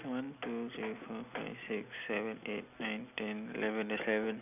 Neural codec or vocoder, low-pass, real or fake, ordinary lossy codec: none; 3.6 kHz; real; none